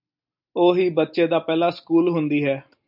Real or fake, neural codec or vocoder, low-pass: real; none; 5.4 kHz